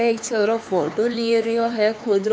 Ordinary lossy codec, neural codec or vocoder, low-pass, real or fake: none; codec, 16 kHz, 4 kbps, X-Codec, HuBERT features, trained on LibriSpeech; none; fake